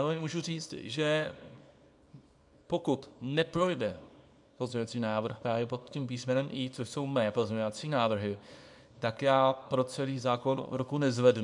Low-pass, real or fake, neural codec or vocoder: 10.8 kHz; fake; codec, 24 kHz, 0.9 kbps, WavTokenizer, small release